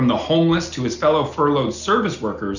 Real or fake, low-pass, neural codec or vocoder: real; 7.2 kHz; none